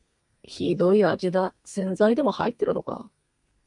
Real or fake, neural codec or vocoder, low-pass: fake; codec, 32 kHz, 1.9 kbps, SNAC; 10.8 kHz